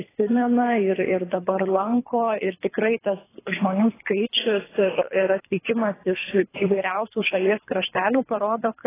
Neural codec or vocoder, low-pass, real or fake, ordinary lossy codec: codec, 24 kHz, 3 kbps, HILCodec; 3.6 kHz; fake; AAC, 16 kbps